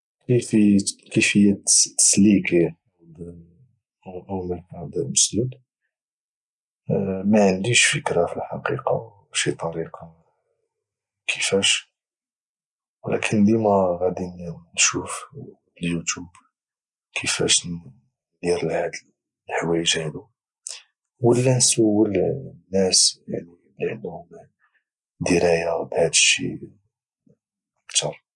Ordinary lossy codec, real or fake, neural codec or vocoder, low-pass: none; real; none; 10.8 kHz